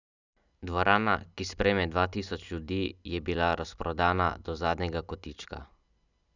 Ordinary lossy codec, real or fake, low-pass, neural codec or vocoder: none; real; 7.2 kHz; none